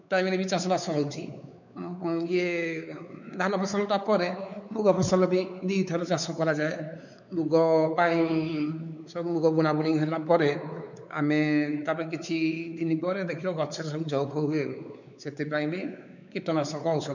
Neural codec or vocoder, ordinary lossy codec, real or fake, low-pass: codec, 16 kHz, 4 kbps, X-Codec, WavLM features, trained on Multilingual LibriSpeech; none; fake; 7.2 kHz